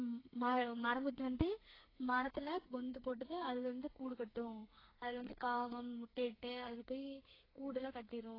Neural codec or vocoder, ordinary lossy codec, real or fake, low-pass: codec, 32 kHz, 1.9 kbps, SNAC; AAC, 24 kbps; fake; 5.4 kHz